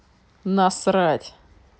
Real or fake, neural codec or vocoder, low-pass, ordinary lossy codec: real; none; none; none